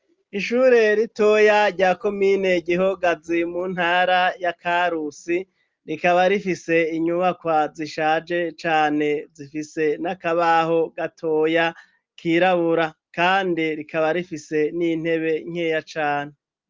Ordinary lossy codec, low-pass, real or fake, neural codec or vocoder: Opus, 24 kbps; 7.2 kHz; real; none